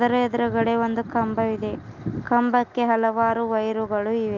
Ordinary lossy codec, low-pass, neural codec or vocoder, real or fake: Opus, 32 kbps; 7.2 kHz; none; real